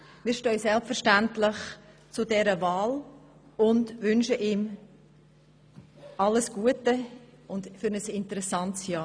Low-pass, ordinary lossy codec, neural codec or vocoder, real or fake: none; none; none; real